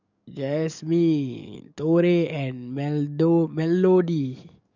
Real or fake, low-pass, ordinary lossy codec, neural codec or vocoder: fake; 7.2 kHz; none; codec, 44.1 kHz, 7.8 kbps, DAC